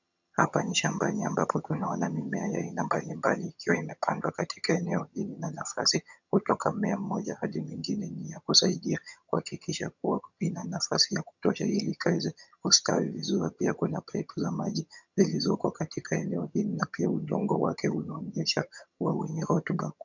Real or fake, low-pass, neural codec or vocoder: fake; 7.2 kHz; vocoder, 22.05 kHz, 80 mel bands, HiFi-GAN